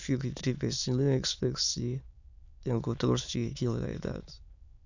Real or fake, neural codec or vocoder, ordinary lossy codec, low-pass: fake; autoencoder, 22.05 kHz, a latent of 192 numbers a frame, VITS, trained on many speakers; none; 7.2 kHz